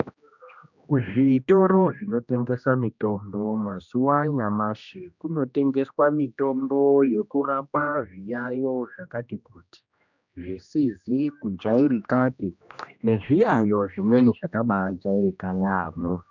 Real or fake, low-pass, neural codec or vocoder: fake; 7.2 kHz; codec, 16 kHz, 1 kbps, X-Codec, HuBERT features, trained on general audio